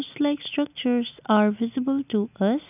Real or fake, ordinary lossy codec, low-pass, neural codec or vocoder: real; none; 3.6 kHz; none